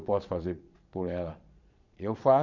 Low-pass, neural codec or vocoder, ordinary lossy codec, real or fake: 7.2 kHz; vocoder, 22.05 kHz, 80 mel bands, Vocos; none; fake